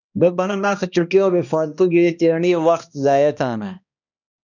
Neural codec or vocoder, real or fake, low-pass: codec, 16 kHz, 1 kbps, X-Codec, HuBERT features, trained on balanced general audio; fake; 7.2 kHz